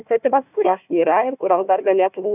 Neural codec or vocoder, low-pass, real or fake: codec, 16 kHz, 1 kbps, FunCodec, trained on Chinese and English, 50 frames a second; 3.6 kHz; fake